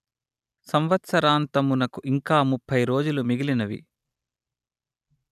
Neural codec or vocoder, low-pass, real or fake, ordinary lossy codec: none; 14.4 kHz; real; none